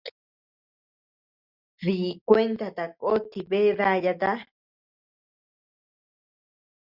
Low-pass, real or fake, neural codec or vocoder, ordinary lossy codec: 5.4 kHz; real; none; Opus, 64 kbps